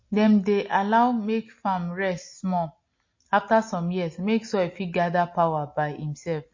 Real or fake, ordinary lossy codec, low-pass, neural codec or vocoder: real; MP3, 32 kbps; 7.2 kHz; none